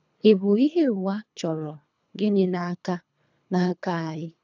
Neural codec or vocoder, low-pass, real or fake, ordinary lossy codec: codec, 24 kHz, 3 kbps, HILCodec; 7.2 kHz; fake; none